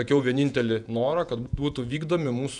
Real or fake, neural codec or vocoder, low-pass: fake; vocoder, 48 kHz, 128 mel bands, Vocos; 10.8 kHz